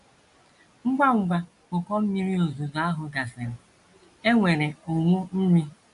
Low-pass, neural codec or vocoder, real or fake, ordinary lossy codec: 10.8 kHz; none; real; AAC, 64 kbps